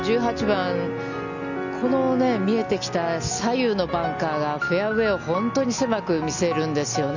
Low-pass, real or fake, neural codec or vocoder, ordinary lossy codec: 7.2 kHz; real; none; none